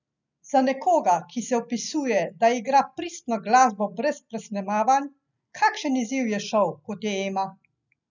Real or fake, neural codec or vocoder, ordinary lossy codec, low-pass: real; none; none; 7.2 kHz